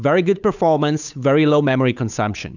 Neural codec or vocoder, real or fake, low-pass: codec, 16 kHz, 8 kbps, FunCodec, trained on Chinese and English, 25 frames a second; fake; 7.2 kHz